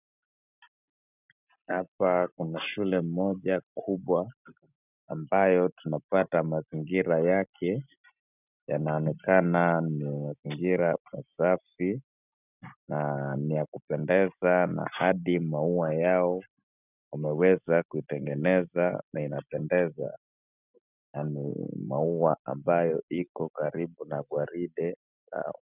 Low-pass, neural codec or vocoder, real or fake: 3.6 kHz; none; real